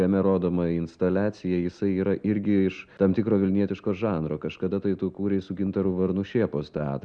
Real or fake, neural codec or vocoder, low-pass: real; none; 9.9 kHz